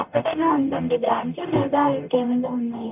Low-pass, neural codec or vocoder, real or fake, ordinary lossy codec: 3.6 kHz; codec, 44.1 kHz, 0.9 kbps, DAC; fake; none